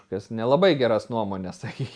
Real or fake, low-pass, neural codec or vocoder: real; 9.9 kHz; none